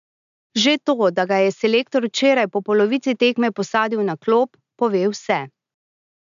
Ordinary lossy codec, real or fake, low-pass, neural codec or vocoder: none; real; 7.2 kHz; none